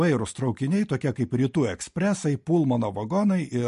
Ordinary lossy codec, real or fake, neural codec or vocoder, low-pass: MP3, 48 kbps; real; none; 14.4 kHz